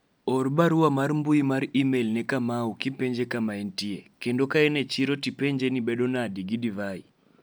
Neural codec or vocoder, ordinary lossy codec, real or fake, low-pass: none; none; real; none